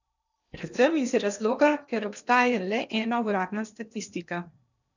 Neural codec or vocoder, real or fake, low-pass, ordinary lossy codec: codec, 16 kHz in and 24 kHz out, 0.8 kbps, FocalCodec, streaming, 65536 codes; fake; 7.2 kHz; none